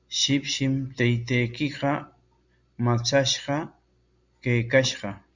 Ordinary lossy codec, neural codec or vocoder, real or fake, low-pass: Opus, 64 kbps; none; real; 7.2 kHz